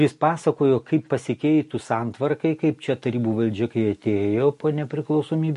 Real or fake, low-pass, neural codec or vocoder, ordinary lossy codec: real; 14.4 kHz; none; MP3, 48 kbps